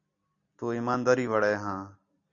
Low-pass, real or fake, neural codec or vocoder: 7.2 kHz; real; none